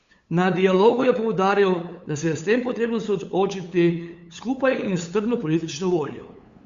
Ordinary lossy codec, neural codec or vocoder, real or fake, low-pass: Opus, 64 kbps; codec, 16 kHz, 8 kbps, FunCodec, trained on LibriTTS, 25 frames a second; fake; 7.2 kHz